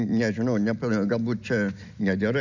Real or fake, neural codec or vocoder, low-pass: real; none; 7.2 kHz